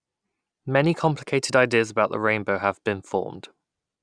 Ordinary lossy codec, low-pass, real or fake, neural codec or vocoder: none; 9.9 kHz; real; none